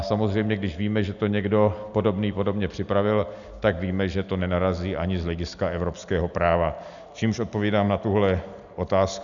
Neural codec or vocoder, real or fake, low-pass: none; real; 7.2 kHz